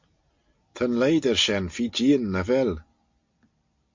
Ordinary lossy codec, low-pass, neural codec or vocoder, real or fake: MP3, 48 kbps; 7.2 kHz; none; real